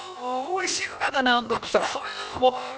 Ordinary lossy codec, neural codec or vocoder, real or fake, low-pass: none; codec, 16 kHz, about 1 kbps, DyCAST, with the encoder's durations; fake; none